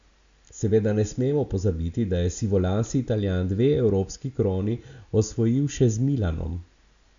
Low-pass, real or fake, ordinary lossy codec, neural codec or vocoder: 7.2 kHz; real; none; none